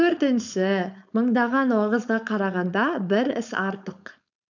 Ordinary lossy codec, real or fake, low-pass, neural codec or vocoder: none; fake; 7.2 kHz; codec, 16 kHz, 4.8 kbps, FACodec